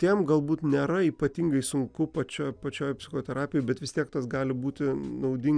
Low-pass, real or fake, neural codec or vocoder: 9.9 kHz; real; none